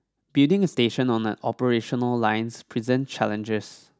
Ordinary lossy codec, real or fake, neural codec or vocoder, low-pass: none; real; none; none